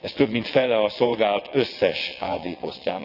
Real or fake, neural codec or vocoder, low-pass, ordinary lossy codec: fake; vocoder, 22.05 kHz, 80 mel bands, WaveNeXt; 5.4 kHz; none